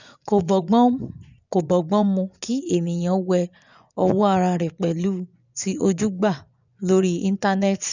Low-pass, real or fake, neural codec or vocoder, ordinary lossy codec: 7.2 kHz; fake; vocoder, 24 kHz, 100 mel bands, Vocos; none